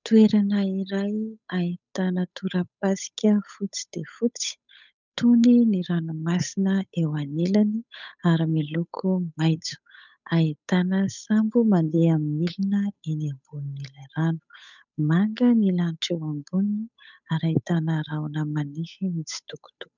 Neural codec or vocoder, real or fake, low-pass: codec, 16 kHz, 8 kbps, FunCodec, trained on Chinese and English, 25 frames a second; fake; 7.2 kHz